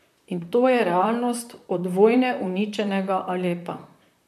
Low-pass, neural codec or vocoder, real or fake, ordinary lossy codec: 14.4 kHz; vocoder, 44.1 kHz, 128 mel bands, Pupu-Vocoder; fake; MP3, 96 kbps